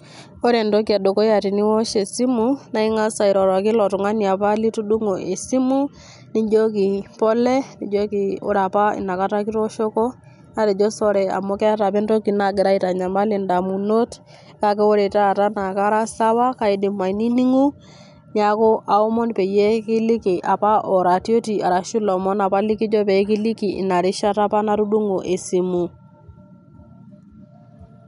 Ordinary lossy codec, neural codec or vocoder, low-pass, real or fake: none; none; 10.8 kHz; real